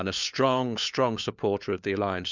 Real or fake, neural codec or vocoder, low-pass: fake; codec, 16 kHz, 4 kbps, FunCodec, trained on LibriTTS, 50 frames a second; 7.2 kHz